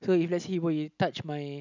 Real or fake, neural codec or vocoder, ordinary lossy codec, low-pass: real; none; none; 7.2 kHz